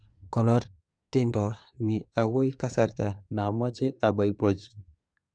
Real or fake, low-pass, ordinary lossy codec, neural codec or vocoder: fake; 9.9 kHz; none; codec, 24 kHz, 1 kbps, SNAC